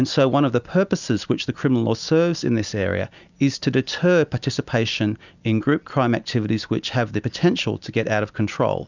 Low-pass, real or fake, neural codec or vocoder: 7.2 kHz; real; none